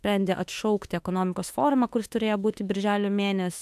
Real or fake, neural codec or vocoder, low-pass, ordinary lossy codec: fake; autoencoder, 48 kHz, 32 numbers a frame, DAC-VAE, trained on Japanese speech; 14.4 kHz; AAC, 96 kbps